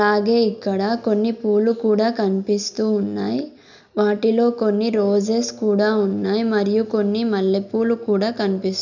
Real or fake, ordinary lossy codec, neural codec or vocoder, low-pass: real; none; none; 7.2 kHz